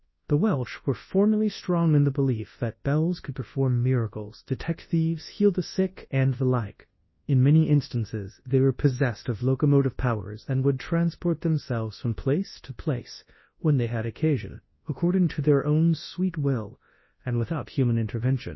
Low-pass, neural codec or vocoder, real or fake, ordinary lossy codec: 7.2 kHz; codec, 24 kHz, 0.9 kbps, WavTokenizer, large speech release; fake; MP3, 24 kbps